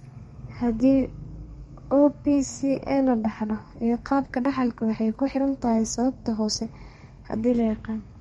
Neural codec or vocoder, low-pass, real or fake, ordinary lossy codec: codec, 32 kHz, 1.9 kbps, SNAC; 14.4 kHz; fake; MP3, 48 kbps